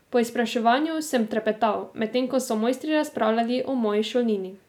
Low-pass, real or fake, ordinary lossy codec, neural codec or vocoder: 19.8 kHz; real; none; none